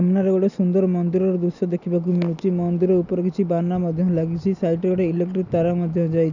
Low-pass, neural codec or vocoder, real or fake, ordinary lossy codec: 7.2 kHz; none; real; none